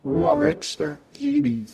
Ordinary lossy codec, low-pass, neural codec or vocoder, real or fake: AAC, 96 kbps; 14.4 kHz; codec, 44.1 kHz, 0.9 kbps, DAC; fake